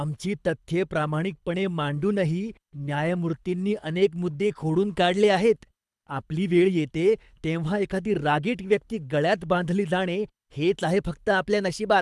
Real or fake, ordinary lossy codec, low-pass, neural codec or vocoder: fake; none; none; codec, 24 kHz, 6 kbps, HILCodec